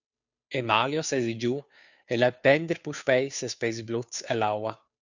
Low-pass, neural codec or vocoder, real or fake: 7.2 kHz; codec, 16 kHz, 2 kbps, FunCodec, trained on Chinese and English, 25 frames a second; fake